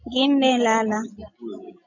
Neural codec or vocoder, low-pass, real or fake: none; 7.2 kHz; real